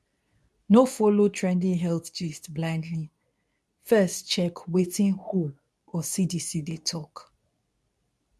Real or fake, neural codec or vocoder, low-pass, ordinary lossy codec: fake; codec, 24 kHz, 0.9 kbps, WavTokenizer, medium speech release version 2; none; none